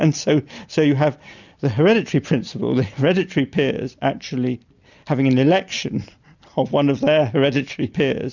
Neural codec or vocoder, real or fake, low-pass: none; real; 7.2 kHz